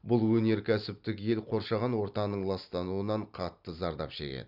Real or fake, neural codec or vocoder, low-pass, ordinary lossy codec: real; none; 5.4 kHz; none